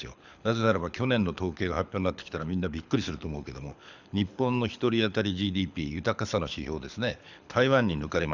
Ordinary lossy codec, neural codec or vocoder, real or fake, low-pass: none; codec, 24 kHz, 6 kbps, HILCodec; fake; 7.2 kHz